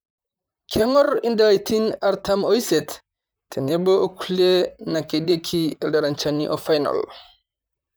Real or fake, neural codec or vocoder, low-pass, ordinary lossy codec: fake; vocoder, 44.1 kHz, 128 mel bands, Pupu-Vocoder; none; none